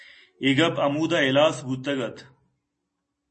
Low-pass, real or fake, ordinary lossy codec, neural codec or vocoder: 10.8 kHz; real; MP3, 32 kbps; none